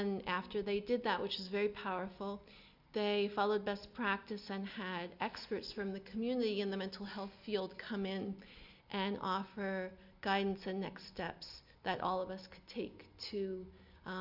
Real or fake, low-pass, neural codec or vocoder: real; 5.4 kHz; none